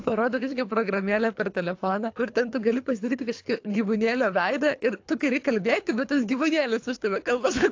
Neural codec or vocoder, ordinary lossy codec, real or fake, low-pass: codec, 24 kHz, 3 kbps, HILCodec; AAC, 48 kbps; fake; 7.2 kHz